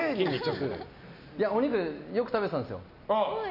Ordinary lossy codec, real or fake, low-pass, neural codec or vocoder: none; real; 5.4 kHz; none